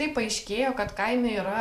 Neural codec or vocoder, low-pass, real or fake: vocoder, 44.1 kHz, 128 mel bands every 512 samples, BigVGAN v2; 14.4 kHz; fake